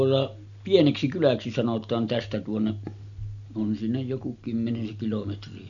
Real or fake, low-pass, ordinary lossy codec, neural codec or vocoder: real; 7.2 kHz; none; none